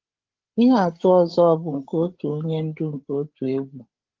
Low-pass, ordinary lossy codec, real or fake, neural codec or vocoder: 7.2 kHz; Opus, 16 kbps; fake; codec, 16 kHz, 8 kbps, FreqCodec, larger model